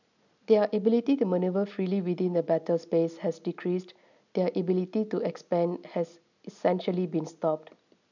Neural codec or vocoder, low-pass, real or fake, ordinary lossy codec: none; 7.2 kHz; real; none